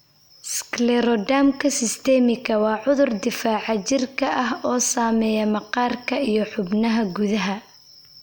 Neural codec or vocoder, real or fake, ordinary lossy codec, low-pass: none; real; none; none